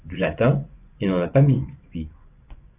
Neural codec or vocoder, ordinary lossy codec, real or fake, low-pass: vocoder, 24 kHz, 100 mel bands, Vocos; Opus, 64 kbps; fake; 3.6 kHz